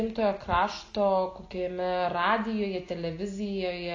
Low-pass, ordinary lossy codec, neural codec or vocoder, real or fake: 7.2 kHz; AAC, 32 kbps; none; real